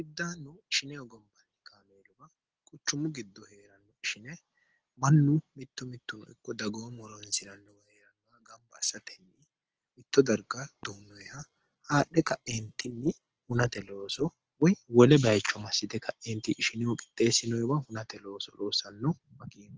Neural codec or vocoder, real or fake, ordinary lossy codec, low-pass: none; real; Opus, 16 kbps; 7.2 kHz